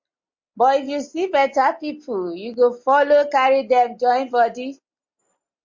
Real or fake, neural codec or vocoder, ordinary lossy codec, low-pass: real; none; MP3, 48 kbps; 7.2 kHz